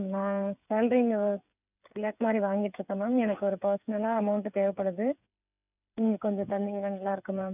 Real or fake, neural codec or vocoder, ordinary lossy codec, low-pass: fake; codec, 16 kHz, 8 kbps, FreqCodec, smaller model; none; 3.6 kHz